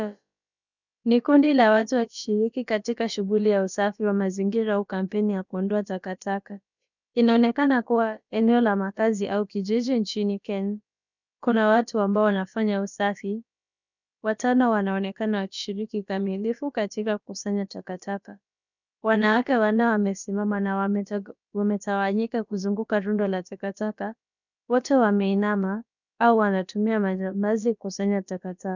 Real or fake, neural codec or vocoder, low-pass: fake; codec, 16 kHz, about 1 kbps, DyCAST, with the encoder's durations; 7.2 kHz